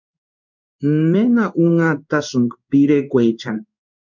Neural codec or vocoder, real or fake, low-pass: codec, 16 kHz in and 24 kHz out, 1 kbps, XY-Tokenizer; fake; 7.2 kHz